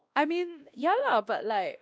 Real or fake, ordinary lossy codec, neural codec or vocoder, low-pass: fake; none; codec, 16 kHz, 1 kbps, X-Codec, WavLM features, trained on Multilingual LibriSpeech; none